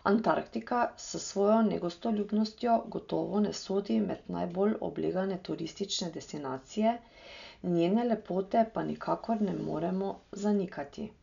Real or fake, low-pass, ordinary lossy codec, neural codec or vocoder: real; 7.2 kHz; MP3, 96 kbps; none